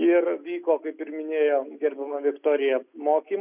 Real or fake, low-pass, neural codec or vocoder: real; 3.6 kHz; none